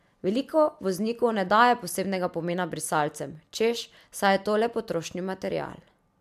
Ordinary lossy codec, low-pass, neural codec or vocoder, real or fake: MP3, 96 kbps; 14.4 kHz; none; real